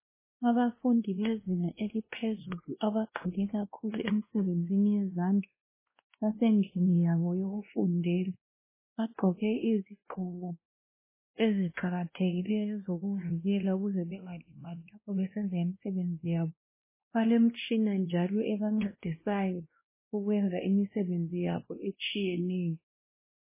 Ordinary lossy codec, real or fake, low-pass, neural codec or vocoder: MP3, 16 kbps; fake; 3.6 kHz; codec, 16 kHz, 1 kbps, X-Codec, WavLM features, trained on Multilingual LibriSpeech